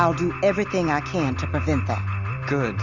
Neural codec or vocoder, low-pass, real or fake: none; 7.2 kHz; real